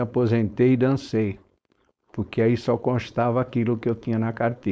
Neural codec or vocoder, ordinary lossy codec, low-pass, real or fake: codec, 16 kHz, 4.8 kbps, FACodec; none; none; fake